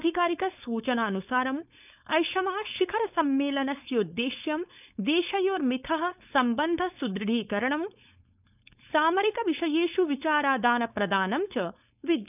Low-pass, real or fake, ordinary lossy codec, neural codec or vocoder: 3.6 kHz; fake; none; codec, 16 kHz, 4.8 kbps, FACodec